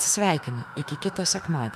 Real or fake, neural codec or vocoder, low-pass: fake; autoencoder, 48 kHz, 32 numbers a frame, DAC-VAE, trained on Japanese speech; 14.4 kHz